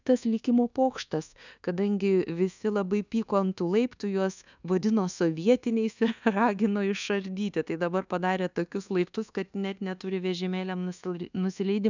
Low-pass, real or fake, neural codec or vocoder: 7.2 kHz; fake; codec, 24 kHz, 1.2 kbps, DualCodec